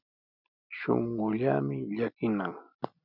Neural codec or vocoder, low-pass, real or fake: none; 5.4 kHz; real